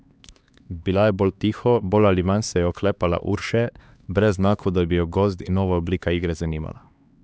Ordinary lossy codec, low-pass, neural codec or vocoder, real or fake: none; none; codec, 16 kHz, 2 kbps, X-Codec, HuBERT features, trained on LibriSpeech; fake